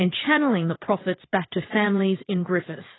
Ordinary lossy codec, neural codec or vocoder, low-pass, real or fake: AAC, 16 kbps; vocoder, 22.05 kHz, 80 mel bands, WaveNeXt; 7.2 kHz; fake